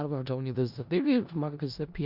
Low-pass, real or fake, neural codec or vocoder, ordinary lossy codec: 5.4 kHz; fake; codec, 16 kHz in and 24 kHz out, 0.4 kbps, LongCat-Audio-Codec, four codebook decoder; Opus, 64 kbps